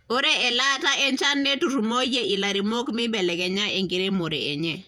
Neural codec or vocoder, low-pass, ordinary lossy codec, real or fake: vocoder, 48 kHz, 128 mel bands, Vocos; 19.8 kHz; none; fake